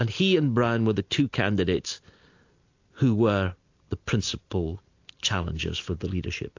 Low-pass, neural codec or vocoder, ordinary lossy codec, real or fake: 7.2 kHz; none; AAC, 48 kbps; real